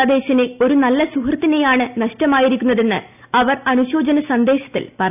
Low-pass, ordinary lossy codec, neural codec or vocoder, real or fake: 3.6 kHz; none; none; real